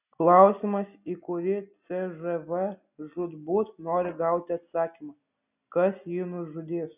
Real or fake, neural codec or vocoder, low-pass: real; none; 3.6 kHz